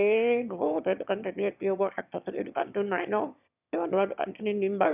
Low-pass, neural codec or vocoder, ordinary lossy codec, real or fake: 3.6 kHz; autoencoder, 22.05 kHz, a latent of 192 numbers a frame, VITS, trained on one speaker; none; fake